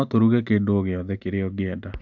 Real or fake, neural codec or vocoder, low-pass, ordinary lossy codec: real; none; 7.2 kHz; none